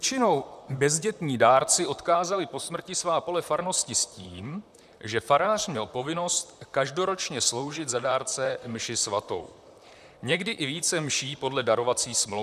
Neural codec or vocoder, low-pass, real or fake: vocoder, 44.1 kHz, 128 mel bands, Pupu-Vocoder; 14.4 kHz; fake